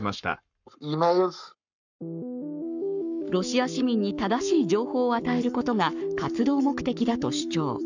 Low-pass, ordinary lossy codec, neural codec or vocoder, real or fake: 7.2 kHz; none; codec, 44.1 kHz, 7.8 kbps, Pupu-Codec; fake